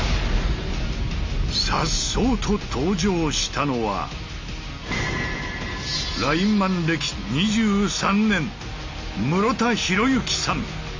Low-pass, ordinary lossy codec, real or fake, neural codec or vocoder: 7.2 kHz; MP3, 48 kbps; real; none